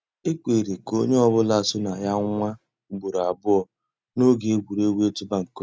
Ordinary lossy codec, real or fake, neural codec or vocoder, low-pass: none; real; none; none